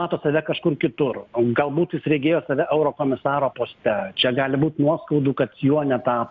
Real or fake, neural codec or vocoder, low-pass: real; none; 7.2 kHz